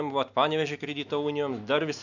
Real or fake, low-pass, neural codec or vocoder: real; 7.2 kHz; none